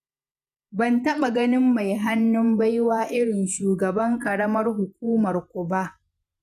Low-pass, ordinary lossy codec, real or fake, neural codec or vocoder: 14.4 kHz; none; fake; vocoder, 48 kHz, 128 mel bands, Vocos